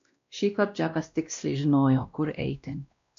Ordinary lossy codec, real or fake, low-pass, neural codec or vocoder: AAC, 64 kbps; fake; 7.2 kHz; codec, 16 kHz, 1 kbps, X-Codec, WavLM features, trained on Multilingual LibriSpeech